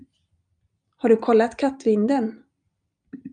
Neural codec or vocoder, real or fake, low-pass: none; real; 9.9 kHz